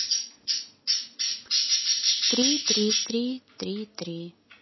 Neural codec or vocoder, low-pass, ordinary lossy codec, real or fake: none; 7.2 kHz; MP3, 24 kbps; real